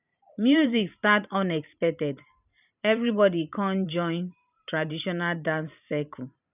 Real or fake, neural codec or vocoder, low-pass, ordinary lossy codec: fake; vocoder, 44.1 kHz, 128 mel bands every 512 samples, BigVGAN v2; 3.6 kHz; none